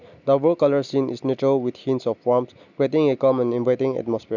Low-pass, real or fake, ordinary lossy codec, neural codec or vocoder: 7.2 kHz; real; none; none